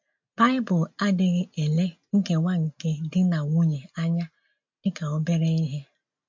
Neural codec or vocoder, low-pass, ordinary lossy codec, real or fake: none; 7.2 kHz; MP3, 48 kbps; real